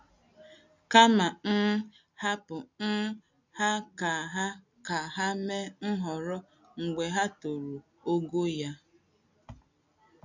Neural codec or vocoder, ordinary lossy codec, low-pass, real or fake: none; none; 7.2 kHz; real